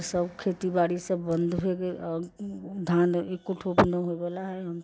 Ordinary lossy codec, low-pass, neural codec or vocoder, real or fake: none; none; none; real